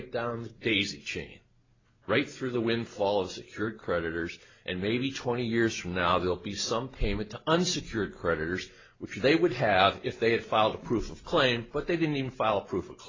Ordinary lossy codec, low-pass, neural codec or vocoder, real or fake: AAC, 32 kbps; 7.2 kHz; none; real